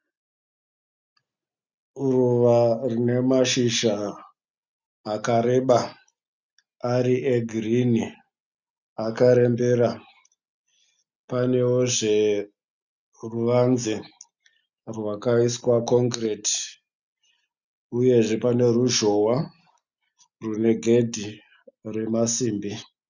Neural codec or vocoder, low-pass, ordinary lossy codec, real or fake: none; 7.2 kHz; Opus, 64 kbps; real